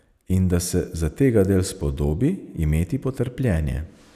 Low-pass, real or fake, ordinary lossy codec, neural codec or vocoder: 14.4 kHz; real; none; none